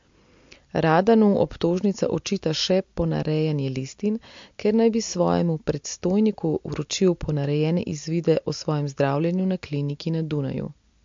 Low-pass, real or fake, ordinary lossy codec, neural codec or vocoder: 7.2 kHz; real; MP3, 48 kbps; none